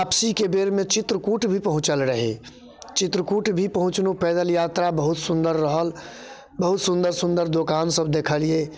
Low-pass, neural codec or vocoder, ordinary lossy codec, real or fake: none; none; none; real